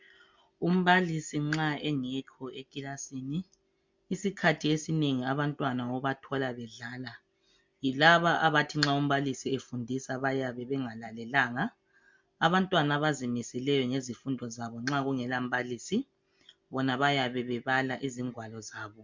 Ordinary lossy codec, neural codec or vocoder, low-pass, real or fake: MP3, 64 kbps; none; 7.2 kHz; real